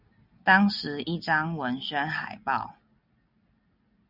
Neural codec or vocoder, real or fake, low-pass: none; real; 5.4 kHz